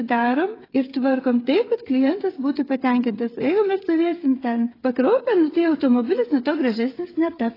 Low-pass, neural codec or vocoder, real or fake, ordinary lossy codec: 5.4 kHz; codec, 16 kHz, 8 kbps, FreqCodec, smaller model; fake; AAC, 24 kbps